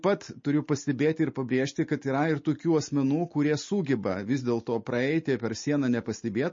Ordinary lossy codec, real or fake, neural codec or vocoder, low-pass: MP3, 32 kbps; real; none; 7.2 kHz